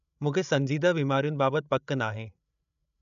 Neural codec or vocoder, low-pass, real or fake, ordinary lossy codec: codec, 16 kHz, 16 kbps, FreqCodec, larger model; 7.2 kHz; fake; none